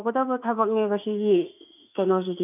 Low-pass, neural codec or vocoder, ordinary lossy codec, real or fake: 3.6 kHz; autoencoder, 48 kHz, 32 numbers a frame, DAC-VAE, trained on Japanese speech; none; fake